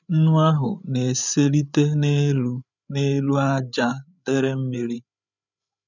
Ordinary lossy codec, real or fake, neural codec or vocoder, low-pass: none; fake; codec, 16 kHz, 8 kbps, FreqCodec, larger model; 7.2 kHz